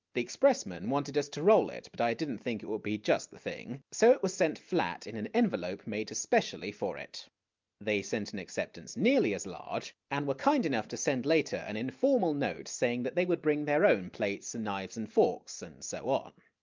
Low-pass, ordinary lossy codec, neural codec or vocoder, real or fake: 7.2 kHz; Opus, 24 kbps; none; real